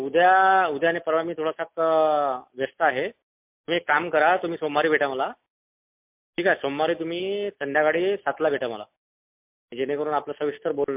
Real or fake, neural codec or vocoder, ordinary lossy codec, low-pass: real; none; MP3, 32 kbps; 3.6 kHz